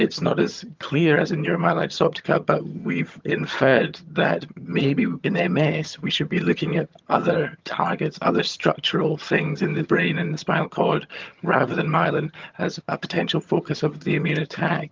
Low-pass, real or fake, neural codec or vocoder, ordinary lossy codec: 7.2 kHz; fake; vocoder, 22.05 kHz, 80 mel bands, HiFi-GAN; Opus, 32 kbps